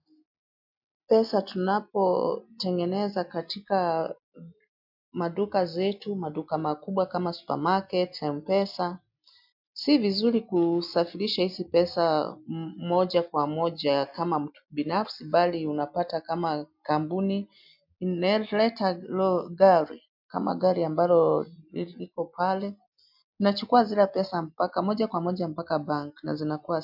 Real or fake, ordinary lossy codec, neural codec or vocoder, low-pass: real; MP3, 48 kbps; none; 5.4 kHz